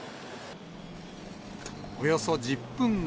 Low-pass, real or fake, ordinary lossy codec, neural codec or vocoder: none; real; none; none